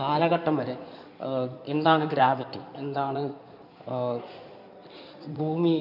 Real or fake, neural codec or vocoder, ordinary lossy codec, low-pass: fake; codec, 16 kHz in and 24 kHz out, 2.2 kbps, FireRedTTS-2 codec; none; 5.4 kHz